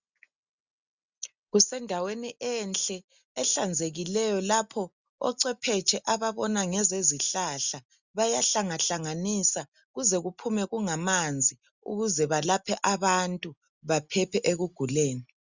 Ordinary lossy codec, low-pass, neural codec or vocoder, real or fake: Opus, 64 kbps; 7.2 kHz; none; real